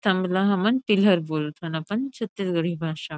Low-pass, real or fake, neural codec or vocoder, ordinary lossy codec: none; real; none; none